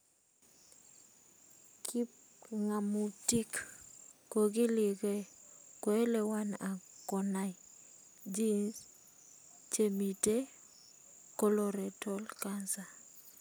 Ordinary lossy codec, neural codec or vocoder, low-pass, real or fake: none; none; none; real